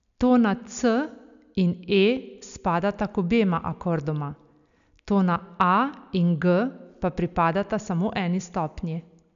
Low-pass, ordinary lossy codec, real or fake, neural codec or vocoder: 7.2 kHz; MP3, 96 kbps; real; none